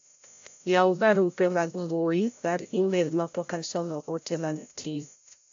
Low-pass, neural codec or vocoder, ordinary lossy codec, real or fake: 7.2 kHz; codec, 16 kHz, 0.5 kbps, FreqCodec, larger model; AAC, 64 kbps; fake